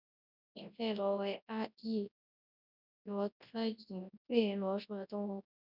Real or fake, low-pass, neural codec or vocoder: fake; 5.4 kHz; codec, 24 kHz, 0.9 kbps, WavTokenizer, large speech release